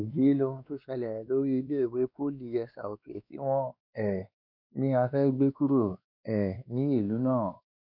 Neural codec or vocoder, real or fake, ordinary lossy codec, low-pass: codec, 16 kHz, 2 kbps, X-Codec, WavLM features, trained on Multilingual LibriSpeech; fake; MP3, 48 kbps; 5.4 kHz